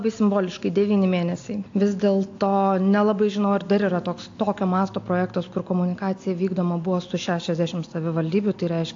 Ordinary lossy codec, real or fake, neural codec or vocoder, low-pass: AAC, 48 kbps; real; none; 7.2 kHz